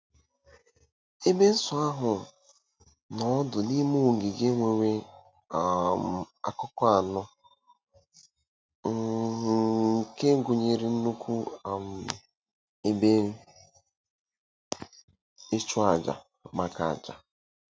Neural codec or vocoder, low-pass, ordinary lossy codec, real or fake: none; none; none; real